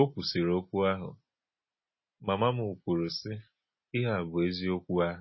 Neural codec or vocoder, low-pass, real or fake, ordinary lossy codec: none; 7.2 kHz; real; MP3, 24 kbps